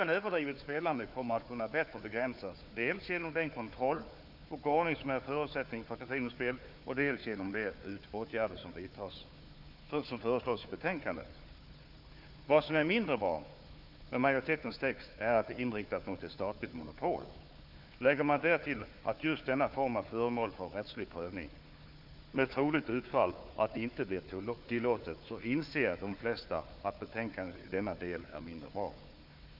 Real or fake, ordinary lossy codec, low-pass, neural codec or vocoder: fake; none; 5.4 kHz; codec, 16 kHz, 4 kbps, FunCodec, trained on Chinese and English, 50 frames a second